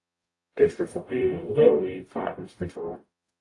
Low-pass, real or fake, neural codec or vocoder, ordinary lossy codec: 10.8 kHz; fake; codec, 44.1 kHz, 0.9 kbps, DAC; AAC, 48 kbps